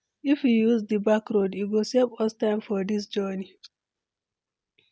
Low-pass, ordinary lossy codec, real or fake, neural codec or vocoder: none; none; real; none